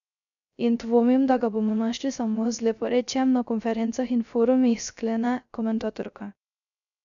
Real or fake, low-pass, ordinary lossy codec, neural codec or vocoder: fake; 7.2 kHz; none; codec, 16 kHz, 0.3 kbps, FocalCodec